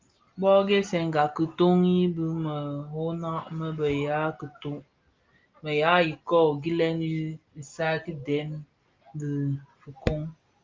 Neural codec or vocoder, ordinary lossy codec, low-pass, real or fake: none; Opus, 32 kbps; 7.2 kHz; real